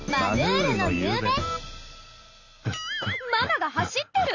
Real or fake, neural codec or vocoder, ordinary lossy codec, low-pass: real; none; none; 7.2 kHz